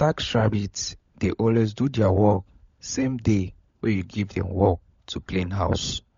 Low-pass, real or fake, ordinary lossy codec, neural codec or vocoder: 7.2 kHz; fake; MP3, 48 kbps; codec, 16 kHz, 16 kbps, FunCodec, trained on LibriTTS, 50 frames a second